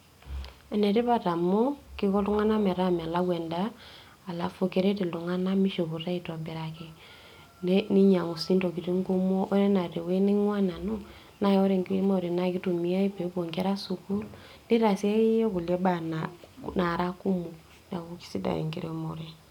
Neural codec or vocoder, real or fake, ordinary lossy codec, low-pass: none; real; none; 19.8 kHz